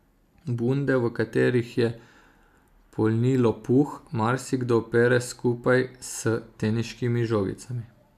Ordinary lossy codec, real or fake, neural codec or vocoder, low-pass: AAC, 96 kbps; real; none; 14.4 kHz